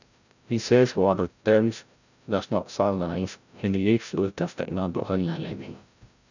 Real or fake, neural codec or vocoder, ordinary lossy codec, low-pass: fake; codec, 16 kHz, 0.5 kbps, FreqCodec, larger model; none; 7.2 kHz